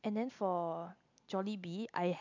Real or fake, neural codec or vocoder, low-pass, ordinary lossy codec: real; none; 7.2 kHz; MP3, 64 kbps